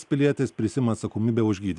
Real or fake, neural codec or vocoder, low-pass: real; none; 10.8 kHz